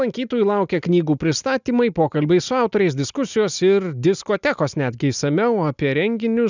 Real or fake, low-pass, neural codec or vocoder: real; 7.2 kHz; none